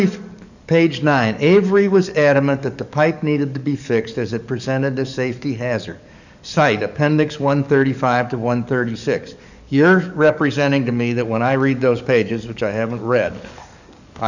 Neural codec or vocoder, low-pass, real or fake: codec, 44.1 kHz, 7.8 kbps, DAC; 7.2 kHz; fake